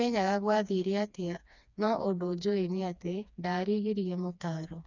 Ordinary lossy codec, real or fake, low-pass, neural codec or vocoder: none; fake; 7.2 kHz; codec, 16 kHz, 2 kbps, FreqCodec, smaller model